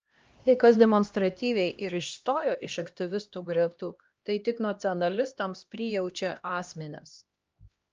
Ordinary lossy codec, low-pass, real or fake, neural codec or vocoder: Opus, 24 kbps; 7.2 kHz; fake; codec, 16 kHz, 1 kbps, X-Codec, HuBERT features, trained on LibriSpeech